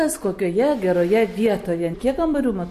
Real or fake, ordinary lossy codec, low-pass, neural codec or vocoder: real; MP3, 64 kbps; 14.4 kHz; none